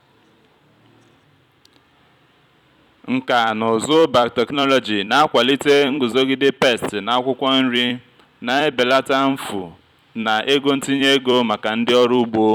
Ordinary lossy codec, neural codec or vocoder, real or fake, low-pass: none; vocoder, 44.1 kHz, 128 mel bands every 256 samples, BigVGAN v2; fake; 19.8 kHz